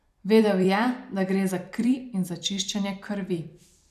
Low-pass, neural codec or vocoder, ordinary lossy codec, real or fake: 14.4 kHz; vocoder, 44.1 kHz, 128 mel bands every 256 samples, BigVGAN v2; none; fake